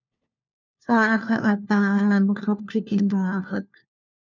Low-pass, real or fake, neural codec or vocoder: 7.2 kHz; fake; codec, 16 kHz, 1 kbps, FunCodec, trained on LibriTTS, 50 frames a second